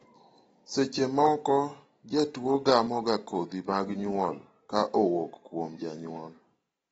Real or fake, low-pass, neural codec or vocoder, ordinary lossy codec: real; 19.8 kHz; none; AAC, 24 kbps